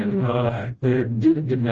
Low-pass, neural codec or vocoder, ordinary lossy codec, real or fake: 7.2 kHz; codec, 16 kHz, 0.5 kbps, FreqCodec, smaller model; Opus, 24 kbps; fake